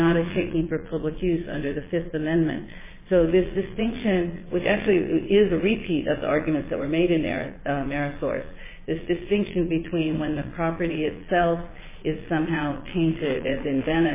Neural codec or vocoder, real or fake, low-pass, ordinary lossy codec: vocoder, 22.05 kHz, 80 mel bands, Vocos; fake; 3.6 kHz; MP3, 16 kbps